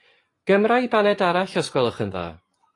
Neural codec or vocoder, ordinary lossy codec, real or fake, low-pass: vocoder, 24 kHz, 100 mel bands, Vocos; AAC, 48 kbps; fake; 10.8 kHz